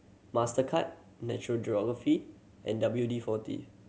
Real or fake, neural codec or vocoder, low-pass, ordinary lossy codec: real; none; none; none